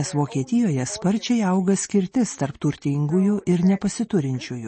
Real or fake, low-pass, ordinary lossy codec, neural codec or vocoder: real; 10.8 kHz; MP3, 32 kbps; none